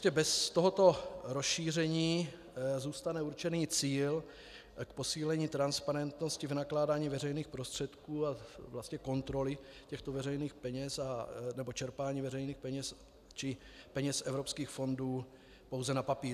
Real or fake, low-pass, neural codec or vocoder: real; 14.4 kHz; none